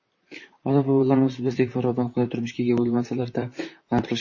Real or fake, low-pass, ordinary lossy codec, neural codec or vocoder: fake; 7.2 kHz; MP3, 32 kbps; vocoder, 44.1 kHz, 128 mel bands, Pupu-Vocoder